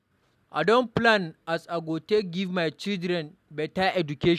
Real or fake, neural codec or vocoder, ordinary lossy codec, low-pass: real; none; none; 14.4 kHz